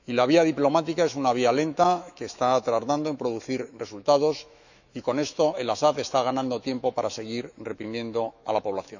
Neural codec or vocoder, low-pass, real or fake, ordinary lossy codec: autoencoder, 48 kHz, 128 numbers a frame, DAC-VAE, trained on Japanese speech; 7.2 kHz; fake; none